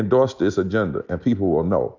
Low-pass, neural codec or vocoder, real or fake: 7.2 kHz; none; real